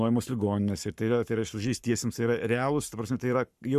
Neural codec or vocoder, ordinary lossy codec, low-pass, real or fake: codec, 44.1 kHz, 7.8 kbps, Pupu-Codec; Opus, 64 kbps; 14.4 kHz; fake